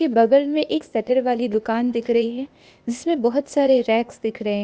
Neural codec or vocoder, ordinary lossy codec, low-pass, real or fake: codec, 16 kHz, 0.8 kbps, ZipCodec; none; none; fake